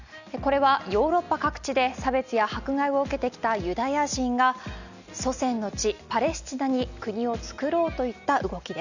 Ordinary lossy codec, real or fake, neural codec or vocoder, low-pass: none; real; none; 7.2 kHz